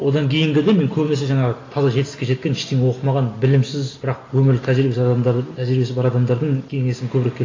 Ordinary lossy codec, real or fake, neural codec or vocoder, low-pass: AAC, 32 kbps; real; none; 7.2 kHz